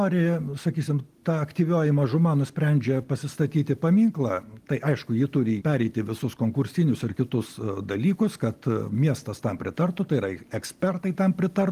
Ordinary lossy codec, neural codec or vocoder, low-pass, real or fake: Opus, 24 kbps; none; 14.4 kHz; real